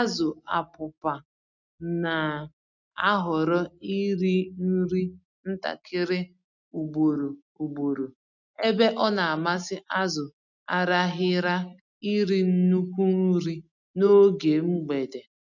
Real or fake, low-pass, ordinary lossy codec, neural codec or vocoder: real; 7.2 kHz; none; none